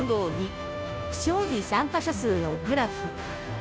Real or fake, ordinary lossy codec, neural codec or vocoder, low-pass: fake; none; codec, 16 kHz, 0.5 kbps, FunCodec, trained on Chinese and English, 25 frames a second; none